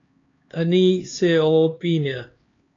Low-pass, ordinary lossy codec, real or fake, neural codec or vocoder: 7.2 kHz; AAC, 32 kbps; fake; codec, 16 kHz, 2 kbps, X-Codec, HuBERT features, trained on LibriSpeech